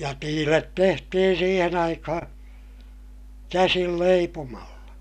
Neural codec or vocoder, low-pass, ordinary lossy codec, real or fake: none; 14.4 kHz; none; real